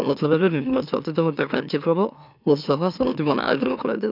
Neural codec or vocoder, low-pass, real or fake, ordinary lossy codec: autoencoder, 44.1 kHz, a latent of 192 numbers a frame, MeloTTS; 5.4 kHz; fake; none